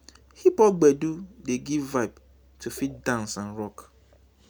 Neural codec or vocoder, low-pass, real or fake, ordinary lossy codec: none; none; real; none